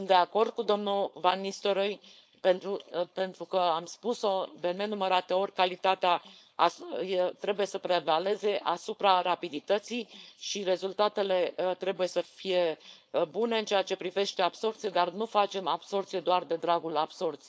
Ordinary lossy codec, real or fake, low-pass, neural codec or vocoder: none; fake; none; codec, 16 kHz, 4.8 kbps, FACodec